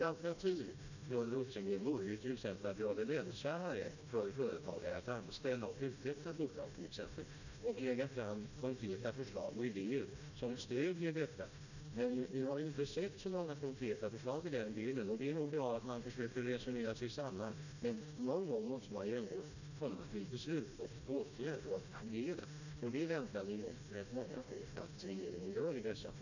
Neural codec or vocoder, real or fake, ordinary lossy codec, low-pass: codec, 16 kHz, 1 kbps, FreqCodec, smaller model; fake; none; 7.2 kHz